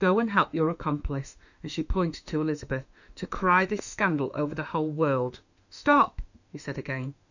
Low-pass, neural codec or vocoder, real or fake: 7.2 kHz; autoencoder, 48 kHz, 32 numbers a frame, DAC-VAE, trained on Japanese speech; fake